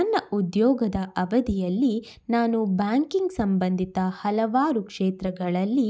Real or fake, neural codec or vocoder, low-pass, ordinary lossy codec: real; none; none; none